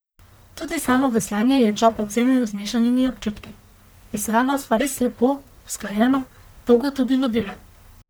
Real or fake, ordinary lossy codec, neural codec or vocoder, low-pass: fake; none; codec, 44.1 kHz, 1.7 kbps, Pupu-Codec; none